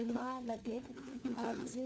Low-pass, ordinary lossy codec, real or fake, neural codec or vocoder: none; none; fake; codec, 16 kHz, 4 kbps, FunCodec, trained on LibriTTS, 50 frames a second